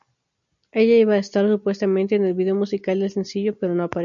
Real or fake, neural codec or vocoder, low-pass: real; none; 7.2 kHz